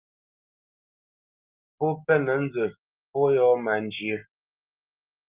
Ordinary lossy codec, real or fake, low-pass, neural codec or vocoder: Opus, 32 kbps; real; 3.6 kHz; none